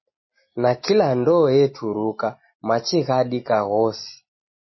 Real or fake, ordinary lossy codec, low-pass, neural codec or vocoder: real; MP3, 24 kbps; 7.2 kHz; none